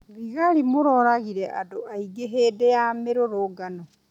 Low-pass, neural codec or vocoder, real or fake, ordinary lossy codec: 19.8 kHz; none; real; none